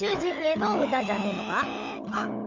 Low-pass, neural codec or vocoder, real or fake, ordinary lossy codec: 7.2 kHz; codec, 16 kHz, 16 kbps, FunCodec, trained on LibriTTS, 50 frames a second; fake; MP3, 64 kbps